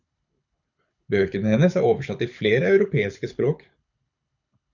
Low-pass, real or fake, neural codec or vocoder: 7.2 kHz; fake; codec, 24 kHz, 6 kbps, HILCodec